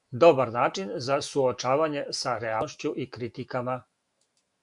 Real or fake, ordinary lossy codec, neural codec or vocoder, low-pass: fake; Opus, 64 kbps; autoencoder, 48 kHz, 128 numbers a frame, DAC-VAE, trained on Japanese speech; 10.8 kHz